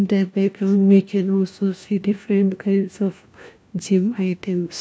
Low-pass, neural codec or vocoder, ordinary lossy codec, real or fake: none; codec, 16 kHz, 1 kbps, FunCodec, trained on LibriTTS, 50 frames a second; none; fake